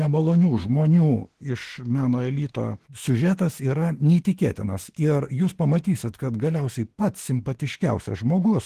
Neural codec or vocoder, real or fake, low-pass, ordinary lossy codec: autoencoder, 48 kHz, 32 numbers a frame, DAC-VAE, trained on Japanese speech; fake; 14.4 kHz; Opus, 16 kbps